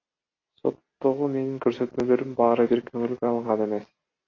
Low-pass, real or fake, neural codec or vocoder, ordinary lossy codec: 7.2 kHz; real; none; AAC, 32 kbps